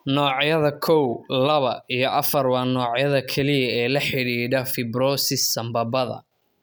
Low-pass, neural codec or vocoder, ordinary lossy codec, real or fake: none; none; none; real